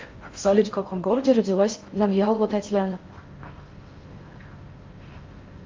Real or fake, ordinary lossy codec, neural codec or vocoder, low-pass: fake; Opus, 32 kbps; codec, 16 kHz in and 24 kHz out, 0.6 kbps, FocalCodec, streaming, 4096 codes; 7.2 kHz